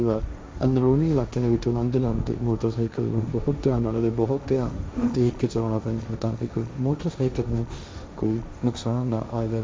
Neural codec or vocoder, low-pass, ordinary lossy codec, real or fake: codec, 16 kHz, 1.1 kbps, Voila-Tokenizer; none; none; fake